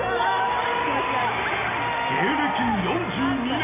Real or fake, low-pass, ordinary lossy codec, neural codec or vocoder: real; 3.6 kHz; none; none